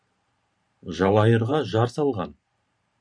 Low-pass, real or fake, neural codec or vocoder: 9.9 kHz; fake; vocoder, 44.1 kHz, 128 mel bands every 512 samples, BigVGAN v2